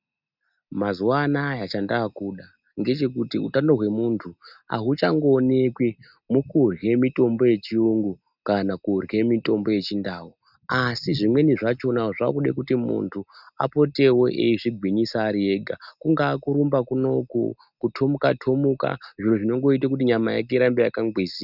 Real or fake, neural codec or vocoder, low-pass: real; none; 5.4 kHz